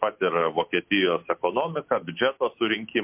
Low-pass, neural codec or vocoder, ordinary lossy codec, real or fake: 3.6 kHz; none; MP3, 32 kbps; real